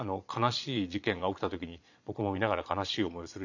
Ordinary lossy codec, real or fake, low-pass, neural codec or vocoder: none; fake; 7.2 kHz; vocoder, 22.05 kHz, 80 mel bands, Vocos